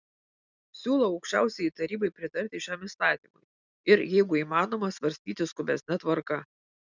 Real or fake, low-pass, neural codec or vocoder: real; 7.2 kHz; none